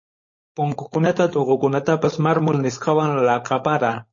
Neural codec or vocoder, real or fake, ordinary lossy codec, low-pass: codec, 16 kHz, 4.8 kbps, FACodec; fake; MP3, 32 kbps; 7.2 kHz